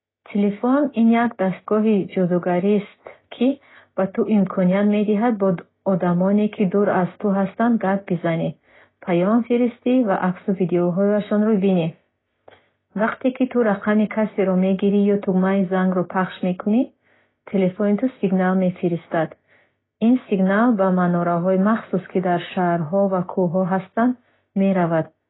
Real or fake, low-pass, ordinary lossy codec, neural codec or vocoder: real; 7.2 kHz; AAC, 16 kbps; none